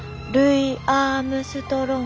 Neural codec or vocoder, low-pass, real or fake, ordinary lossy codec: none; none; real; none